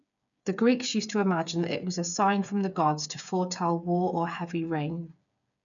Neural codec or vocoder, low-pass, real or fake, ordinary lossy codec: codec, 16 kHz, 8 kbps, FreqCodec, smaller model; 7.2 kHz; fake; none